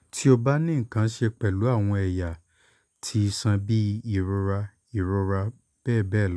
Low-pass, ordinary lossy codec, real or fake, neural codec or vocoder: none; none; real; none